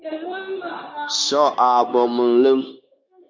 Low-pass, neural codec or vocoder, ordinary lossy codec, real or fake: 7.2 kHz; codec, 16 kHz, 0.9 kbps, LongCat-Audio-Codec; MP3, 48 kbps; fake